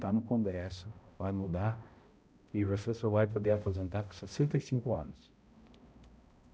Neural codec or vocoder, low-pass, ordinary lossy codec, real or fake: codec, 16 kHz, 0.5 kbps, X-Codec, HuBERT features, trained on balanced general audio; none; none; fake